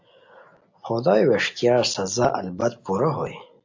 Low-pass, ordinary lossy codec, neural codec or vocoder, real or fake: 7.2 kHz; AAC, 48 kbps; none; real